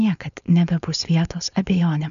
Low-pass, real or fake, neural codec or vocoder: 7.2 kHz; fake; codec, 16 kHz, 4.8 kbps, FACodec